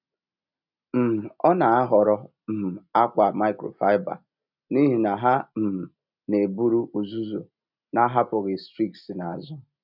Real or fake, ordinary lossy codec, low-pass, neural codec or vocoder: real; none; 5.4 kHz; none